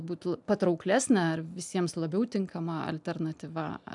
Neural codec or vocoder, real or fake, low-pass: none; real; 10.8 kHz